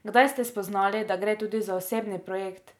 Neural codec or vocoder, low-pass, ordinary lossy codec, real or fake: none; 19.8 kHz; none; real